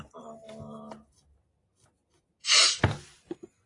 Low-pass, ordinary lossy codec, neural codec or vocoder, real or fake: 10.8 kHz; AAC, 32 kbps; none; real